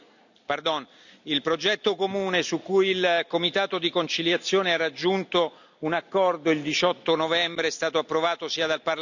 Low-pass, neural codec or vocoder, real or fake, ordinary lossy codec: 7.2 kHz; none; real; none